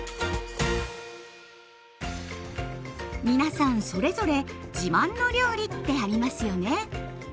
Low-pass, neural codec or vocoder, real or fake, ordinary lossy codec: none; none; real; none